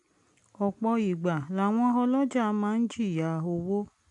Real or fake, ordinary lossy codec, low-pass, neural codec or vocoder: real; AAC, 64 kbps; 10.8 kHz; none